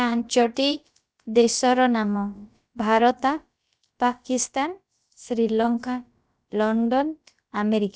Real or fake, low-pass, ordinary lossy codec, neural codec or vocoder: fake; none; none; codec, 16 kHz, about 1 kbps, DyCAST, with the encoder's durations